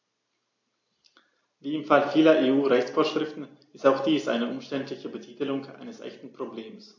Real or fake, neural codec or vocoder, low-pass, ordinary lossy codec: real; none; 7.2 kHz; AAC, 48 kbps